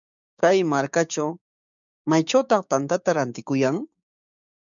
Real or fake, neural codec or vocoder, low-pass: fake; codec, 16 kHz, 6 kbps, DAC; 7.2 kHz